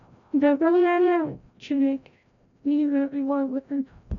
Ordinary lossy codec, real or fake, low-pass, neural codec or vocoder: none; fake; 7.2 kHz; codec, 16 kHz, 0.5 kbps, FreqCodec, larger model